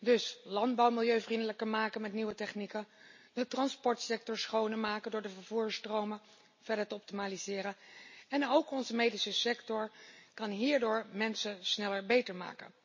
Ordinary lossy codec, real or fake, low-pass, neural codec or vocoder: MP3, 32 kbps; real; 7.2 kHz; none